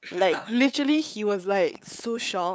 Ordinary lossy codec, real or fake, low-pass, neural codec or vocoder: none; fake; none; codec, 16 kHz, 8 kbps, FunCodec, trained on LibriTTS, 25 frames a second